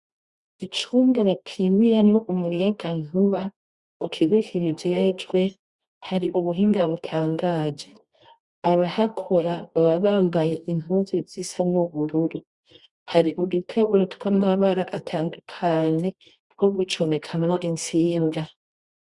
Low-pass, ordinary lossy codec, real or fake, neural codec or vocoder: 10.8 kHz; Opus, 64 kbps; fake; codec, 24 kHz, 0.9 kbps, WavTokenizer, medium music audio release